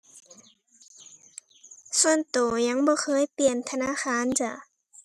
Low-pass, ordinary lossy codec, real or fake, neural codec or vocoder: 14.4 kHz; none; real; none